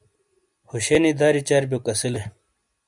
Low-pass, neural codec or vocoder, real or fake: 10.8 kHz; none; real